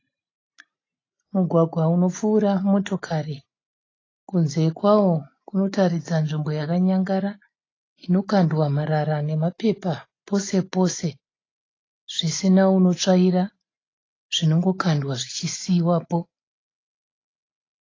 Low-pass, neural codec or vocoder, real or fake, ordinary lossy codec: 7.2 kHz; none; real; AAC, 32 kbps